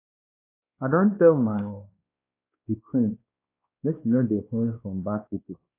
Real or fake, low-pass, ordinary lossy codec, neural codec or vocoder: fake; 3.6 kHz; AAC, 24 kbps; codec, 16 kHz, 2 kbps, X-Codec, WavLM features, trained on Multilingual LibriSpeech